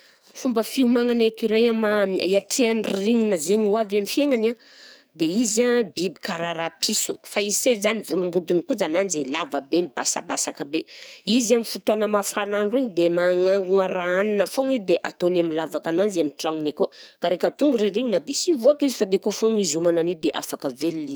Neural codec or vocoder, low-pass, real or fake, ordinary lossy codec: codec, 44.1 kHz, 2.6 kbps, SNAC; none; fake; none